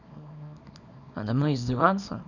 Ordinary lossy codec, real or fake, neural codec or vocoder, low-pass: none; fake; codec, 24 kHz, 0.9 kbps, WavTokenizer, small release; 7.2 kHz